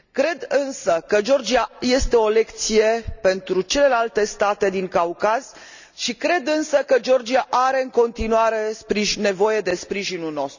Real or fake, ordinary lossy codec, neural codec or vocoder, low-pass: real; none; none; 7.2 kHz